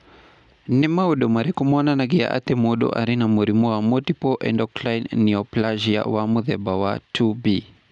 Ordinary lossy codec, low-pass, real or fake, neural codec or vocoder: none; 10.8 kHz; real; none